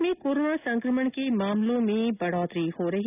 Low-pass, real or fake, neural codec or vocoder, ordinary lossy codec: 3.6 kHz; real; none; none